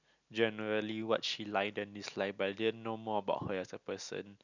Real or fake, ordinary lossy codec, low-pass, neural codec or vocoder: real; none; 7.2 kHz; none